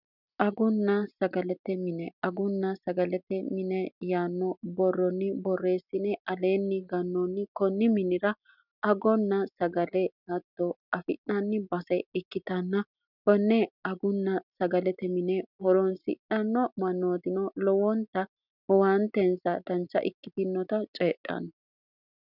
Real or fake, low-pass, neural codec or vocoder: real; 5.4 kHz; none